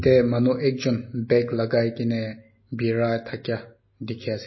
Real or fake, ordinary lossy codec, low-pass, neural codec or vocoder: real; MP3, 24 kbps; 7.2 kHz; none